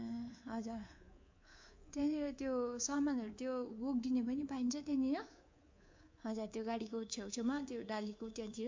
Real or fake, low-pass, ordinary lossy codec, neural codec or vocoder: fake; 7.2 kHz; MP3, 48 kbps; codec, 24 kHz, 3.1 kbps, DualCodec